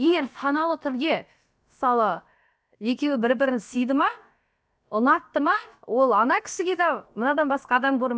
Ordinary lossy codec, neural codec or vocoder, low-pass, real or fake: none; codec, 16 kHz, about 1 kbps, DyCAST, with the encoder's durations; none; fake